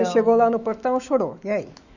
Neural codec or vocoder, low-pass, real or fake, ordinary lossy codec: autoencoder, 48 kHz, 128 numbers a frame, DAC-VAE, trained on Japanese speech; 7.2 kHz; fake; none